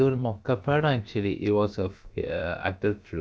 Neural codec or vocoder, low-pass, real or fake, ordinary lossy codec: codec, 16 kHz, about 1 kbps, DyCAST, with the encoder's durations; none; fake; none